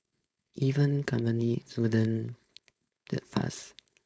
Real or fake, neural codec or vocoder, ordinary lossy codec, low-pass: fake; codec, 16 kHz, 4.8 kbps, FACodec; none; none